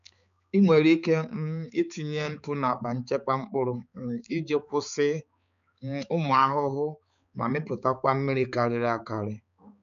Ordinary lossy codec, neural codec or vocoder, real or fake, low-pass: none; codec, 16 kHz, 4 kbps, X-Codec, HuBERT features, trained on balanced general audio; fake; 7.2 kHz